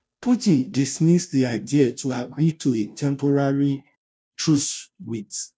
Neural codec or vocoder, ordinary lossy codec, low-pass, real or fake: codec, 16 kHz, 0.5 kbps, FunCodec, trained on Chinese and English, 25 frames a second; none; none; fake